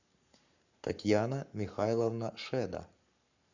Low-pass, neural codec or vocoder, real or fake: 7.2 kHz; codec, 44.1 kHz, 7.8 kbps, Pupu-Codec; fake